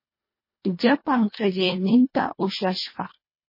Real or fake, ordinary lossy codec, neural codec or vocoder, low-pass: fake; MP3, 24 kbps; codec, 24 kHz, 1.5 kbps, HILCodec; 5.4 kHz